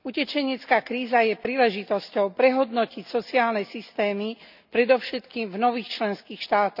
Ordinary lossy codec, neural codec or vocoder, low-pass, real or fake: none; none; 5.4 kHz; real